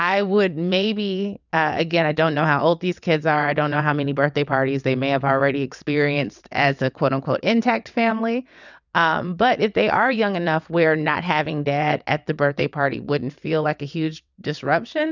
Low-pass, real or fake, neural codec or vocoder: 7.2 kHz; fake; vocoder, 22.05 kHz, 80 mel bands, WaveNeXt